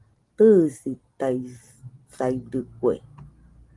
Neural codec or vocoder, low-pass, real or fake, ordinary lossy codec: none; 10.8 kHz; real; Opus, 24 kbps